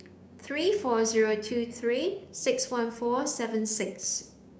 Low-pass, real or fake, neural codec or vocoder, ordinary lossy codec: none; fake; codec, 16 kHz, 6 kbps, DAC; none